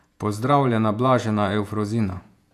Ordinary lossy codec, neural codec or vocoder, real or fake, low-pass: none; vocoder, 48 kHz, 128 mel bands, Vocos; fake; 14.4 kHz